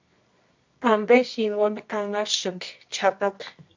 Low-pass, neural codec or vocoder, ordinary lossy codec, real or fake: 7.2 kHz; codec, 24 kHz, 0.9 kbps, WavTokenizer, medium music audio release; MP3, 48 kbps; fake